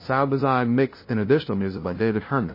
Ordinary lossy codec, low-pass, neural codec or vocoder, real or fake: MP3, 24 kbps; 5.4 kHz; codec, 16 kHz, 0.5 kbps, FunCodec, trained on LibriTTS, 25 frames a second; fake